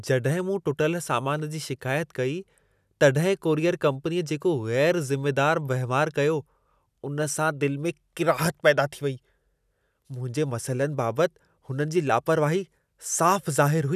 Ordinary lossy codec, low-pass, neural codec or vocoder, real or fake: none; 14.4 kHz; none; real